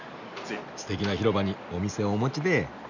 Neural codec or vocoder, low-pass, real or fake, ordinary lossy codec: none; 7.2 kHz; real; none